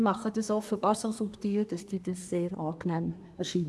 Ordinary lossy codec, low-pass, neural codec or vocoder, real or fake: none; none; codec, 24 kHz, 1 kbps, SNAC; fake